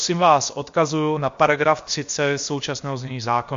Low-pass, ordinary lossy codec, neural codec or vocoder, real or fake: 7.2 kHz; MP3, 48 kbps; codec, 16 kHz, about 1 kbps, DyCAST, with the encoder's durations; fake